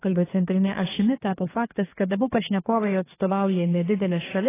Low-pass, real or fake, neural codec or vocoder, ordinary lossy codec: 3.6 kHz; fake; codec, 32 kHz, 1.9 kbps, SNAC; AAC, 16 kbps